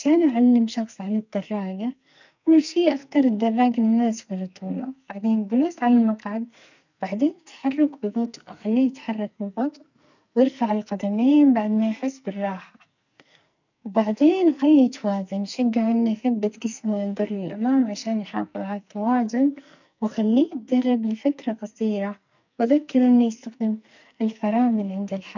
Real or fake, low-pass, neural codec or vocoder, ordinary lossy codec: fake; 7.2 kHz; codec, 44.1 kHz, 2.6 kbps, SNAC; none